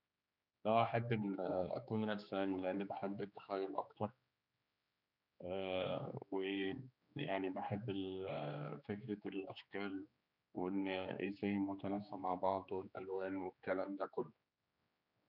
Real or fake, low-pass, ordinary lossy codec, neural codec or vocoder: fake; 5.4 kHz; none; codec, 16 kHz, 2 kbps, X-Codec, HuBERT features, trained on general audio